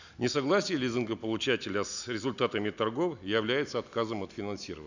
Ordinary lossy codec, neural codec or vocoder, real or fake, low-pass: none; none; real; 7.2 kHz